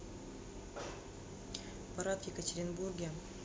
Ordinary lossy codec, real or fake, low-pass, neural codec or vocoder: none; real; none; none